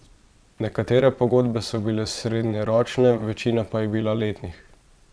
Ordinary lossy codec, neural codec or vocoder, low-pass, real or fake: none; vocoder, 22.05 kHz, 80 mel bands, Vocos; none; fake